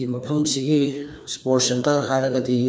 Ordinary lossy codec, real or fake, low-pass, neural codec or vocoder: none; fake; none; codec, 16 kHz, 2 kbps, FreqCodec, larger model